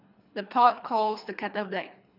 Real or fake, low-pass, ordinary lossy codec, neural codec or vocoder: fake; 5.4 kHz; none; codec, 24 kHz, 3 kbps, HILCodec